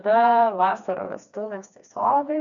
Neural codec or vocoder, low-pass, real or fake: codec, 16 kHz, 2 kbps, FreqCodec, smaller model; 7.2 kHz; fake